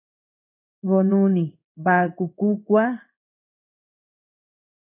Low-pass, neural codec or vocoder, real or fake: 3.6 kHz; codec, 16 kHz in and 24 kHz out, 1 kbps, XY-Tokenizer; fake